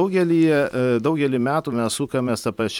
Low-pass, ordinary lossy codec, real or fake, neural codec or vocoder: 19.8 kHz; MP3, 96 kbps; fake; vocoder, 44.1 kHz, 128 mel bands every 512 samples, BigVGAN v2